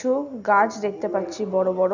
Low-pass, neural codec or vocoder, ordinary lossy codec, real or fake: 7.2 kHz; none; none; real